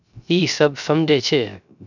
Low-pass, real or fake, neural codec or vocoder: 7.2 kHz; fake; codec, 16 kHz, 0.3 kbps, FocalCodec